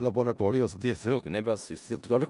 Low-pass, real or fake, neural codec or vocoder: 10.8 kHz; fake; codec, 16 kHz in and 24 kHz out, 0.4 kbps, LongCat-Audio-Codec, four codebook decoder